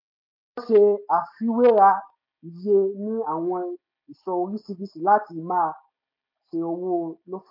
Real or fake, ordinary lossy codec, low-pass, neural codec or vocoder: real; MP3, 32 kbps; 5.4 kHz; none